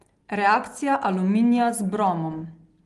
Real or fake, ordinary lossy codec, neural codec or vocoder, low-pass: real; Opus, 24 kbps; none; 10.8 kHz